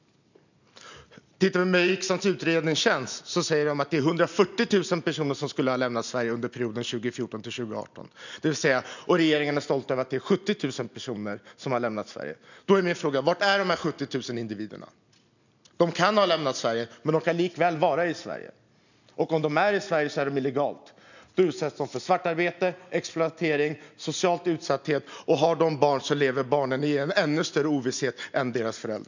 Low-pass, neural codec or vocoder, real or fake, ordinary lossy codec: 7.2 kHz; none; real; none